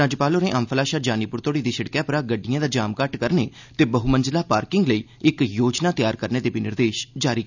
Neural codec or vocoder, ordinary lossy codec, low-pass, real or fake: none; none; 7.2 kHz; real